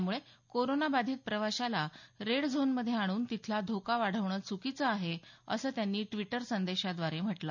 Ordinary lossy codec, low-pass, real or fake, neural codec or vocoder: none; 7.2 kHz; real; none